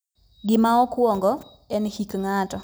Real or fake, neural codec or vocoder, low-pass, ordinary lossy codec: real; none; none; none